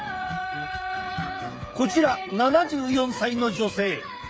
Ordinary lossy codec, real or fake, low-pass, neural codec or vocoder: none; fake; none; codec, 16 kHz, 8 kbps, FreqCodec, smaller model